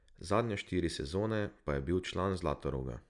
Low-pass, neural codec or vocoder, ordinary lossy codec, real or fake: 10.8 kHz; none; none; real